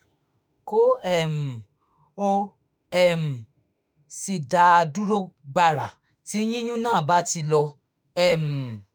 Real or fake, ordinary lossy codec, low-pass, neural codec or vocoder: fake; none; none; autoencoder, 48 kHz, 32 numbers a frame, DAC-VAE, trained on Japanese speech